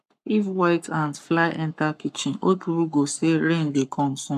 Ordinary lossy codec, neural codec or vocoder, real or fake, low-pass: AAC, 96 kbps; codec, 44.1 kHz, 7.8 kbps, Pupu-Codec; fake; 14.4 kHz